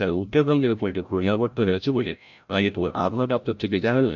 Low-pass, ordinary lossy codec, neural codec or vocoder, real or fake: 7.2 kHz; none; codec, 16 kHz, 0.5 kbps, FreqCodec, larger model; fake